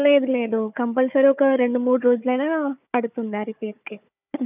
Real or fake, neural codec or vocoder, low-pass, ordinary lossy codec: fake; codec, 16 kHz, 4 kbps, FunCodec, trained on Chinese and English, 50 frames a second; 3.6 kHz; none